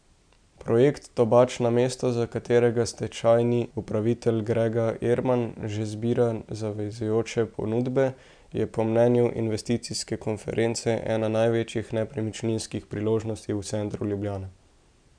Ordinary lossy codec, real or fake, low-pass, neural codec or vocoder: none; real; 9.9 kHz; none